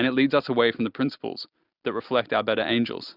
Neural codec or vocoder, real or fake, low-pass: none; real; 5.4 kHz